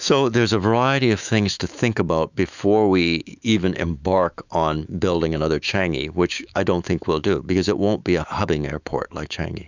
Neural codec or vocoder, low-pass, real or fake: autoencoder, 48 kHz, 128 numbers a frame, DAC-VAE, trained on Japanese speech; 7.2 kHz; fake